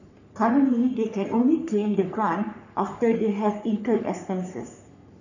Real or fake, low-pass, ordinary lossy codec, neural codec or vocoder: fake; 7.2 kHz; none; codec, 44.1 kHz, 3.4 kbps, Pupu-Codec